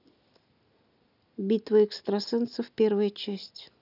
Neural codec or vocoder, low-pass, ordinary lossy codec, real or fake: none; 5.4 kHz; none; real